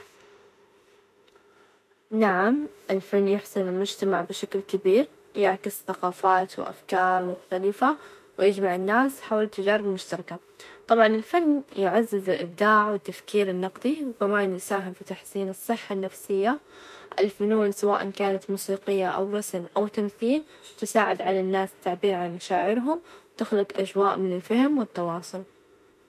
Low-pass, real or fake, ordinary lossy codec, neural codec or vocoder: 14.4 kHz; fake; MP3, 64 kbps; autoencoder, 48 kHz, 32 numbers a frame, DAC-VAE, trained on Japanese speech